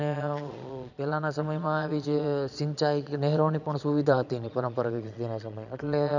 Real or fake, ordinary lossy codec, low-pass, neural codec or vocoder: fake; none; 7.2 kHz; vocoder, 22.05 kHz, 80 mel bands, Vocos